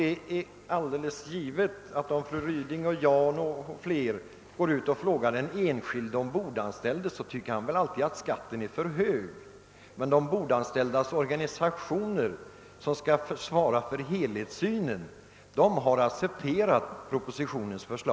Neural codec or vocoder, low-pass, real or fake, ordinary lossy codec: none; none; real; none